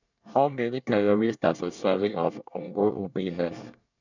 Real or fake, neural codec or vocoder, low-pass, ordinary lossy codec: fake; codec, 24 kHz, 1 kbps, SNAC; 7.2 kHz; none